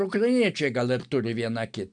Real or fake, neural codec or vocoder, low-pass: real; none; 9.9 kHz